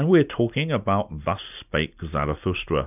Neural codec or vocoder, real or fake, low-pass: none; real; 3.6 kHz